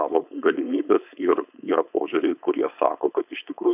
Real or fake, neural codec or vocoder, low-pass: fake; codec, 16 kHz, 4.8 kbps, FACodec; 3.6 kHz